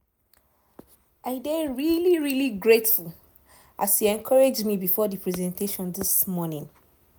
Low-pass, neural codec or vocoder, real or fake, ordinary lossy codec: none; none; real; none